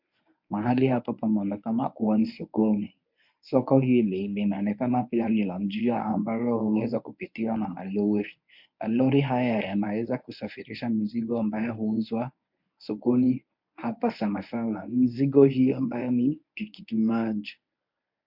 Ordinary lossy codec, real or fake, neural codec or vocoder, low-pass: MP3, 48 kbps; fake; codec, 24 kHz, 0.9 kbps, WavTokenizer, medium speech release version 1; 5.4 kHz